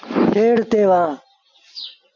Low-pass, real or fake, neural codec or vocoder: 7.2 kHz; real; none